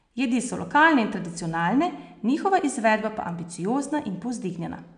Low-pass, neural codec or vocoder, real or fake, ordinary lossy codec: 9.9 kHz; none; real; none